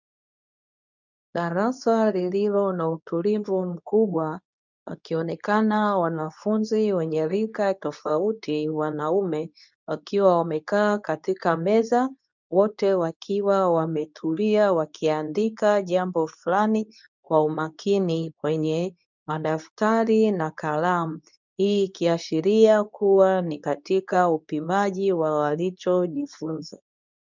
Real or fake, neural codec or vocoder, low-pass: fake; codec, 24 kHz, 0.9 kbps, WavTokenizer, medium speech release version 2; 7.2 kHz